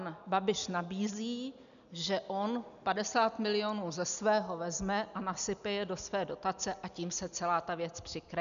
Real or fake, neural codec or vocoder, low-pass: real; none; 7.2 kHz